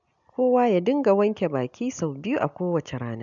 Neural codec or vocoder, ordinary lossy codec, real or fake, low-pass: none; none; real; 7.2 kHz